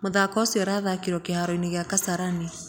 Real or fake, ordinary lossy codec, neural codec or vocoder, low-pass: real; none; none; none